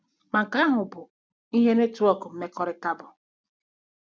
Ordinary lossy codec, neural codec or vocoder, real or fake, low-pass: none; none; real; none